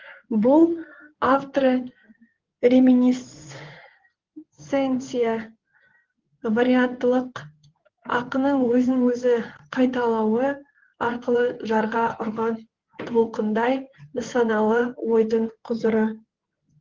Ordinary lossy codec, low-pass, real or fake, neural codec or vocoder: Opus, 16 kbps; 7.2 kHz; fake; codec, 16 kHz in and 24 kHz out, 2.2 kbps, FireRedTTS-2 codec